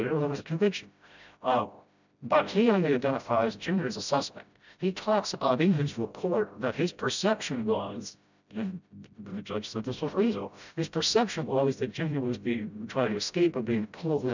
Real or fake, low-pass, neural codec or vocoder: fake; 7.2 kHz; codec, 16 kHz, 0.5 kbps, FreqCodec, smaller model